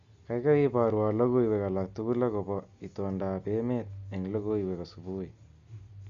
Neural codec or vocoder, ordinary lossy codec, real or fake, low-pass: none; Opus, 64 kbps; real; 7.2 kHz